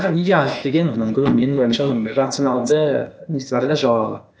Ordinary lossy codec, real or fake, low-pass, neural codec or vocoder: none; fake; none; codec, 16 kHz, 0.8 kbps, ZipCodec